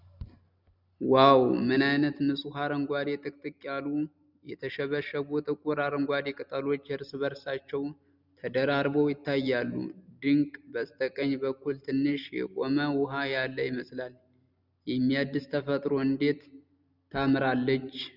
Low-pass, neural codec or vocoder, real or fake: 5.4 kHz; none; real